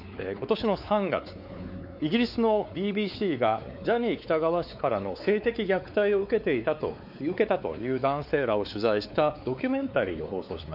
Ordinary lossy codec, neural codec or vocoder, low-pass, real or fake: none; codec, 16 kHz, 4 kbps, X-Codec, WavLM features, trained on Multilingual LibriSpeech; 5.4 kHz; fake